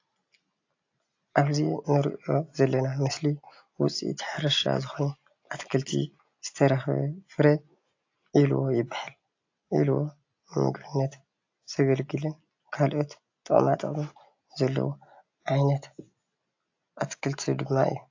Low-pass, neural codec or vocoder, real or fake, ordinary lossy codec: 7.2 kHz; none; real; AAC, 48 kbps